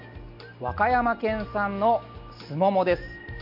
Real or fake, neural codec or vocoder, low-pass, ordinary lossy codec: real; none; 5.4 kHz; none